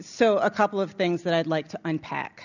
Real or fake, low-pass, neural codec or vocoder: real; 7.2 kHz; none